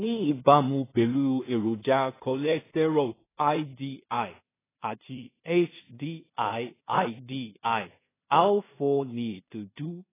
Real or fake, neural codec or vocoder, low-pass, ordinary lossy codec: fake; codec, 16 kHz in and 24 kHz out, 0.4 kbps, LongCat-Audio-Codec, two codebook decoder; 3.6 kHz; AAC, 16 kbps